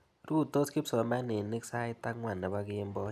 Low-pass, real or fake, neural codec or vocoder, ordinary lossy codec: 14.4 kHz; real; none; none